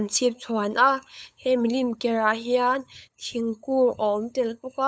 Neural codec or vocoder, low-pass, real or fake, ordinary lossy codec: codec, 16 kHz, 8 kbps, FunCodec, trained on LibriTTS, 25 frames a second; none; fake; none